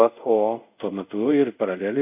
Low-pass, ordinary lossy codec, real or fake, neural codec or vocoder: 3.6 kHz; AAC, 32 kbps; fake; codec, 24 kHz, 0.5 kbps, DualCodec